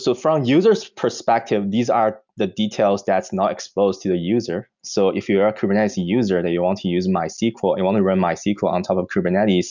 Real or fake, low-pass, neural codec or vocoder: real; 7.2 kHz; none